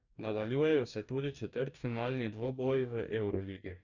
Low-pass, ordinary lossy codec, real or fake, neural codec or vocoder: 7.2 kHz; none; fake; codec, 44.1 kHz, 2.6 kbps, DAC